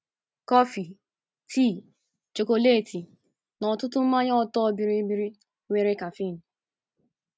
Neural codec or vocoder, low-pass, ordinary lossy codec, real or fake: none; none; none; real